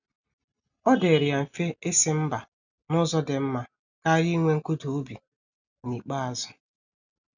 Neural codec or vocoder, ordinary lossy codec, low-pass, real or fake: none; none; 7.2 kHz; real